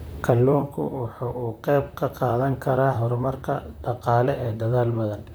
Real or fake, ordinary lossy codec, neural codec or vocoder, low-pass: fake; none; vocoder, 44.1 kHz, 128 mel bands, Pupu-Vocoder; none